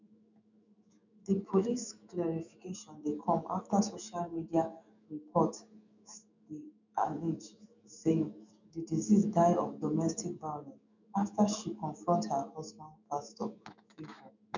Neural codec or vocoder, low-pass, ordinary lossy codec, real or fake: autoencoder, 48 kHz, 128 numbers a frame, DAC-VAE, trained on Japanese speech; 7.2 kHz; none; fake